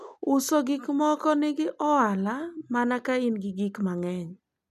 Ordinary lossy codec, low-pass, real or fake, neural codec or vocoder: none; 14.4 kHz; real; none